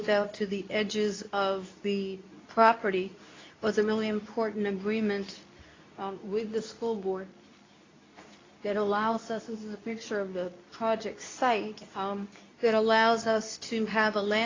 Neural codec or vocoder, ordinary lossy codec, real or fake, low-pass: codec, 24 kHz, 0.9 kbps, WavTokenizer, medium speech release version 2; AAC, 32 kbps; fake; 7.2 kHz